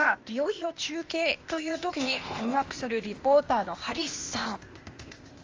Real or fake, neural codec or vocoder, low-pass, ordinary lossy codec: fake; codec, 16 kHz, 0.8 kbps, ZipCodec; 7.2 kHz; Opus, 32 kbps